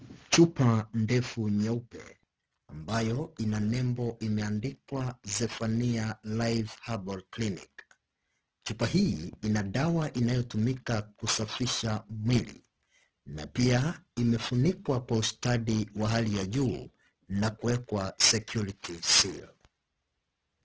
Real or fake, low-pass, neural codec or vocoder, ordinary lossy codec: real; 7.2 kHz; none; Opus, 16 kbps